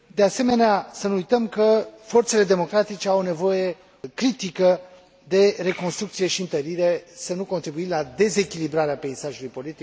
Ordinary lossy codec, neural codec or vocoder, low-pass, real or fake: none; none; none; real